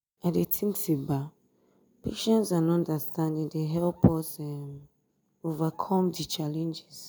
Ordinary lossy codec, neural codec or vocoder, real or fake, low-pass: none; none; real; none